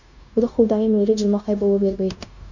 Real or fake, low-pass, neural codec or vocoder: fake; 7.2 kHz; codec, 16 kHz, 0.9 kbps, LongCat-Audio-Codec